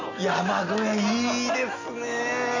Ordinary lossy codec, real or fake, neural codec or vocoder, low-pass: none; real; none; 7.2 kHz